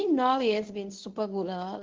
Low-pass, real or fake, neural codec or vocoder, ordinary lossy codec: 7.2 kHz; fake; codec, 24 kHz, 0.9 kbps, WavTokenizer, medium speech release version 1; Opus, 16 kbps